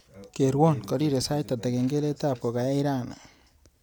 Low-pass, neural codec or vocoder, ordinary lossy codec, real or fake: none; none; none; real